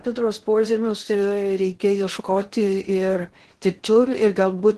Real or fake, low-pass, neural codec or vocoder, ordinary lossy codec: fake; 10.8 kHz; codec, 16 kHz in and 24 kHz out, 0.6 kbps, FocalCodec, streaming, 2048 codes; Opus, 16 kbps